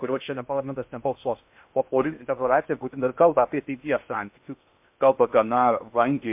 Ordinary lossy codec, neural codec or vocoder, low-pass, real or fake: MP3, 32 kbps; codec, 16 kHz in and 24 kHz out, 0.6 kbps, FocalCodec, streaming, 2048 codes; 3.6 kHz; fake